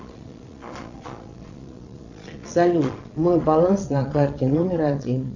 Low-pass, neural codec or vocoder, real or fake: 7.2 kHz; vocoder, 22.05 kHz, 80 mel bands, Vocos; fake